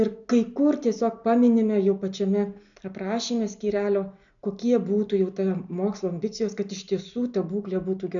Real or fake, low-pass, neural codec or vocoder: real; 7.2 kHz; none